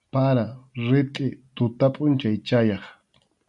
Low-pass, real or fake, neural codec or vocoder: 10.8 kHz; real; none